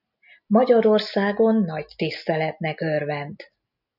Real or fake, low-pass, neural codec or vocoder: real; 5.4 kHz; none